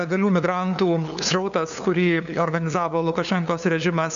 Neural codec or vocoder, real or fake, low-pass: codec, 16 kHz, 2 kbps, FunCodec, trained on LibriTTS, 25 frames a second; fake; 7.2 kHz